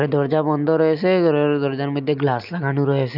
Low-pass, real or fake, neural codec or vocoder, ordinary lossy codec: 5.4 kHz; real; none; none